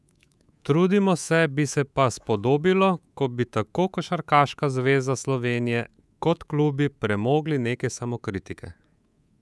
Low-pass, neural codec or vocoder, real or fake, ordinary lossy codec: none; codec, 24 kHz, 3.1 kbps, DualCodec; fake; none